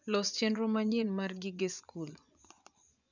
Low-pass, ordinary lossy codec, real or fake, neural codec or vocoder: 7.2 kHz; none; fake; vocoder, 24 kHz, 100 mel bands, Vocos